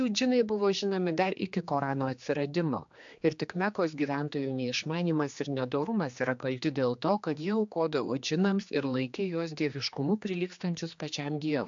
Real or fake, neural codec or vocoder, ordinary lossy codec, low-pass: fake; codec, 16 kHz, 2 kbps, X-Codec, HuBERT features, trained on general audio; AAC, 64 kbps; 7.2 kHz